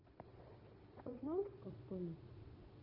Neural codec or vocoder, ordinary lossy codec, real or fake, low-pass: vocoder, 22.05 kHz, 80 mel bands, Vocos; none; fake; 5.4 kHz